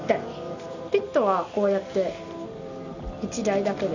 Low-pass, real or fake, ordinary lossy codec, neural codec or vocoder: 7.2 kHz; real; none; none